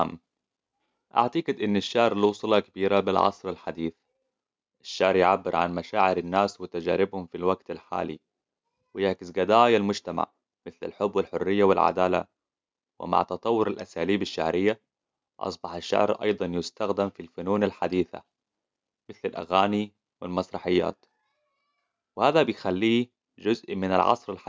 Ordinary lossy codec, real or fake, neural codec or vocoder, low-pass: none; real; none; none